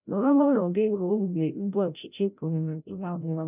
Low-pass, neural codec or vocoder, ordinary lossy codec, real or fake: 3.6 kHz; codec, 16 kHz, 0.5 kbps, FreqCodec, larger model; none; fake